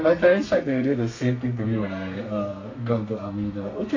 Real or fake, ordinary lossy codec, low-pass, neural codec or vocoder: fake; AAC, 32 kbps; 7.2 kHz; codec, 32 kHz, 1.9 kbps, SNAC